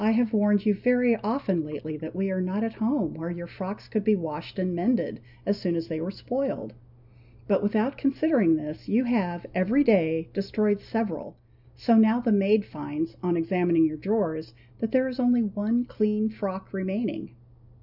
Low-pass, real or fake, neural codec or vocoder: 5.4 kHz; real; none